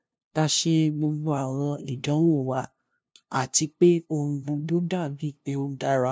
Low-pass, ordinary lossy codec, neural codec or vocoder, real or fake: none; none; codec, 16 kHz, 0.5 kbps, FunCodec, trained on LibriTTS, 25 frames a second; fake